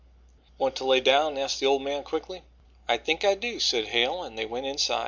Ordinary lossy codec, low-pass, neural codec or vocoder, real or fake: MP3, 64 kbps; 7.2 kHz; none; real